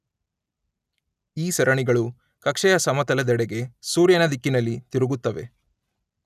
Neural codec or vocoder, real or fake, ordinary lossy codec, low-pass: none; real; none; 14.4 kHz